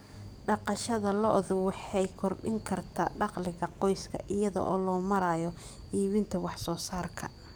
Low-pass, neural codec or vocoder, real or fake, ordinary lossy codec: none; codec, 44.1 kHz, 7.8 kbps, DAC; fake; none